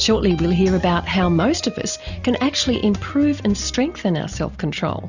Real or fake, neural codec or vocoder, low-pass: real; none; 7.2 kHz